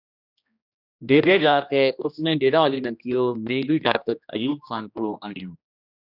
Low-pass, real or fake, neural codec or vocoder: 5.4 kHz; fake; codec, 16 kHz, 1 kbps, X-Codec, HuBERT features, trained on general audio